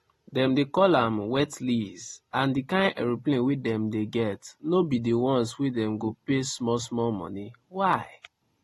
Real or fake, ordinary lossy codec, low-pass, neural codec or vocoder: real; AAC, 32 kbps; 19.8 kHz; none